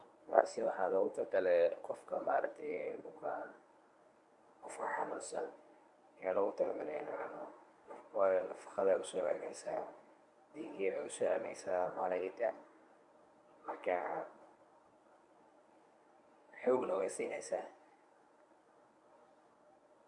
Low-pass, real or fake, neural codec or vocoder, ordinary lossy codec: 10.8 kHz; fake; codec, 24 kHz, 0.9 kbps, WavTokenizer, medium speech release version 1; none